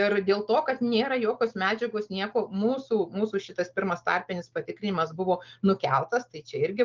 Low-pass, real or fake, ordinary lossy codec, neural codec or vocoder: 7.2 kHz; real; Opus, 32 kbps; none